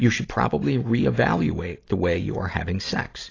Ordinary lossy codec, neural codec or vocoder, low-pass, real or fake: AAC, 32 kbps; none; 7.2 kHz; real